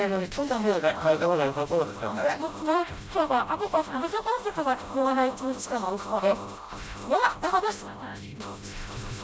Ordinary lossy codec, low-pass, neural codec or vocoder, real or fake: none; none; codec, 16 kHz, 0.5 kbps, FreqCodec, smaller model; fake